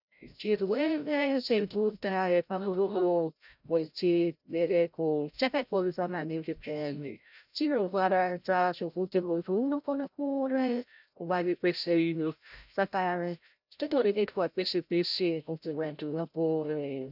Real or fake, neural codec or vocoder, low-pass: fake; codec, 16 kHz, 0.5 kbps, FreqCodec, larger model; 5.4 kHz